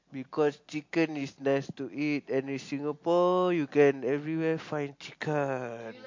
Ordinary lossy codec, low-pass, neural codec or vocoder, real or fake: MP3, 48 kbps; 7.2 kHz; none; real